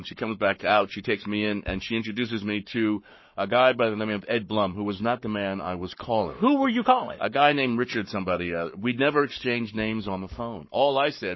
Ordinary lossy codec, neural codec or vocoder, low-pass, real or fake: MP3, 24 kbps; codec, 44.1 kHz, 7.8 kbps, Pupu-Codec; 7.2 kHz; fake